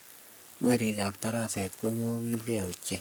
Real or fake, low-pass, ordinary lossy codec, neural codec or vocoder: fake; none; none; codec, 44.1 kHz, 3.4 kbps, Pupu-Codec